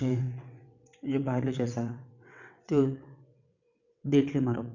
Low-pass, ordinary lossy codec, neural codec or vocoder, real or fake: 7.2 kHz; none; vocoder, 22.05 kHz, 80 mel bands, WaveNeXt; fake